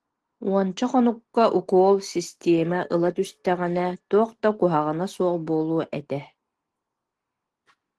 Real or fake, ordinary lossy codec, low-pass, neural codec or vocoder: real; Opus, 16 kbps; 10.8 kHz; none